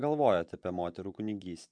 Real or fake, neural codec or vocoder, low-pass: fake; vocoder, 24 kHz, 100 mel bands, Vocos; 9.9 kHz